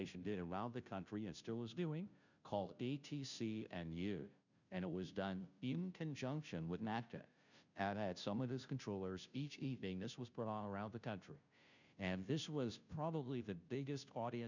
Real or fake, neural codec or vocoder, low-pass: fake; codec, 16 kHz, 0.5 kbps, FunCodec, trained on Chinese and English, 25 frames a second; 7.2 kHz